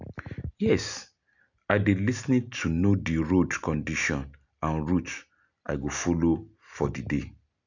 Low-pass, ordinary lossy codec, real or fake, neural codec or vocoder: 7.2 kHz; none; real; none